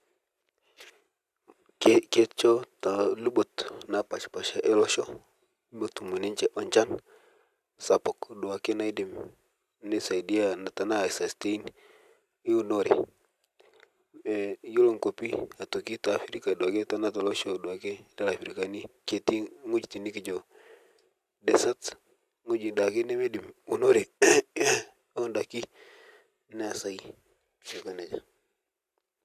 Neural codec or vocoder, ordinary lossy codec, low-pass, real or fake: none; AAC, 96 kbps; 14.4 kHz; real